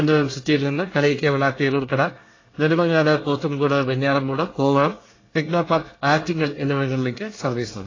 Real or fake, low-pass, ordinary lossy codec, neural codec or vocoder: fake; 7.2 kHz; AAC, 32 kbps; codec, 24 kHz, 1 kbps, SNAC